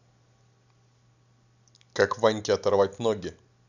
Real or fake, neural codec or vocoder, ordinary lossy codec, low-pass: real; none; none; 7.2 kHz